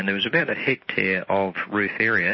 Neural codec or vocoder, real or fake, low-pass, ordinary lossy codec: none; real; 7.2 kHz; MP3, 24 kbps